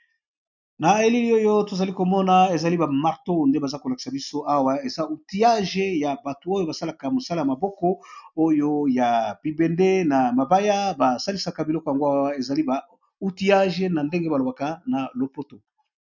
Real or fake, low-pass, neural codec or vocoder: real; 7.2 kHz; none